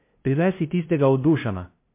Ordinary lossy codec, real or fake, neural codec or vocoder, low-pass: MP3, 24 kbps; fake; codec, 16 kHz, 0.5 kbps, FunCodec, trained on LibriTTS, 25 frames a second; 3.6 kHz